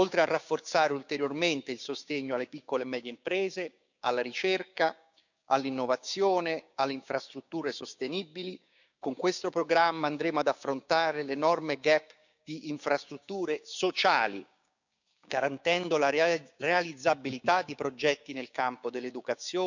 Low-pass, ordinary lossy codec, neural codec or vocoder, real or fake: 7.2 kHz; none; codec, 16 kHz, 6 kbps, DAC; fake